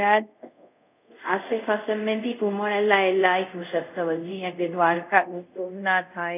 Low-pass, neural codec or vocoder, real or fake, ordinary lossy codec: 3.6 kHz; codec, 24 kHz, 0.5 kbps, DualCodec; fake; none